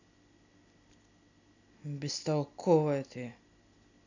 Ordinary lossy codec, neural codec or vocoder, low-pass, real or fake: none; none; 7.2 kHz; real